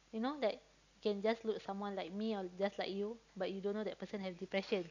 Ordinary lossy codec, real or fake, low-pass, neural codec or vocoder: none; real; 7.2 kHz; none